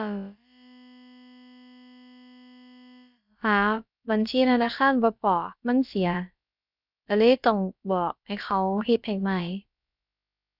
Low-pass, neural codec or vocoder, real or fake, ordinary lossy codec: 5.4 kHz; codec, 16 kHz, about 1 kbps, DyCAST, with the encoder's durations; fake; none